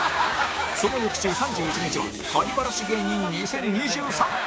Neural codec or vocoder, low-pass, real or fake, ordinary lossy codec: codec, 16 kHz, 6 kbps, DAC; none; fake; none